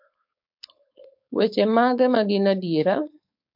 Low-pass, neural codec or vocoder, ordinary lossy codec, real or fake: 5.4 kHz; codec, 16 kHz, 4.8 kbps, FACodec; MP3, 48 kbps; fake